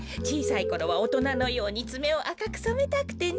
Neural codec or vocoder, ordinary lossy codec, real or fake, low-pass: none; none; real; none